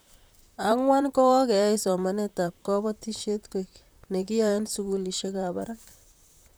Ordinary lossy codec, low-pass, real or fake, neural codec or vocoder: none; none; fake; vocoder, 44.1 kHz, 128 mel bands, Pupu-Vocoder